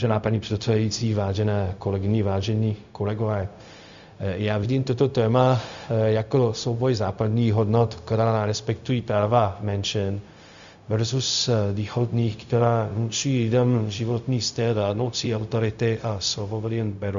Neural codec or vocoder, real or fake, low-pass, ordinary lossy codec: codec, 16 kHz, 0.4 kbps, LongCat-Audio-Codec; fake; 7.2 kHz; Opus, 64 kbps